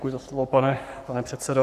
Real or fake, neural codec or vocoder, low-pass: fake; codec, 44.1 kHz, 7.8 kbps, Pupu-Codec; 14.4 kHz